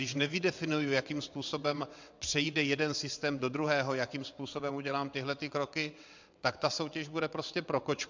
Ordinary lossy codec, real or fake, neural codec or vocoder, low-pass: MP3, 64 kbps; fake; vocoder, 22.05 kHz, 80 mel bands, WaveNeXt; 7.2 kHz